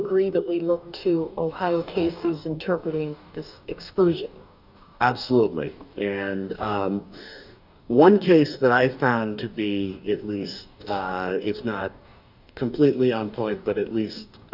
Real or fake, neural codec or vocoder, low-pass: fake; codec, 44.1 kHz, 2.6 kbps, DAC; 5.4 kHz